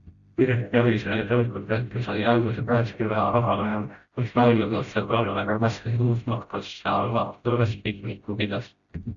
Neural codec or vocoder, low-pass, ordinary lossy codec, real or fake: codec, 16 kHz, 0.5 kbps, FreqCodec, smaller model; 7.2 kHz; Opus, 64 kbps; fake